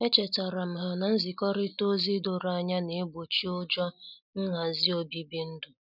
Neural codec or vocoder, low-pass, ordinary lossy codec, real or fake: none; 5.4 kHz; none; real